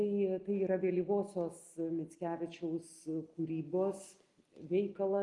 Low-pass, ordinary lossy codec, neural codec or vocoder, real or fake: 10.8 kHz; Opus, 24 kbps; none; real